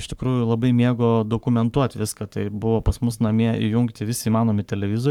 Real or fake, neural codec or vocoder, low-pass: fake; codec, 44.1 kHz, 7.8 kbps, Pupu-Codec; 19.8 kHz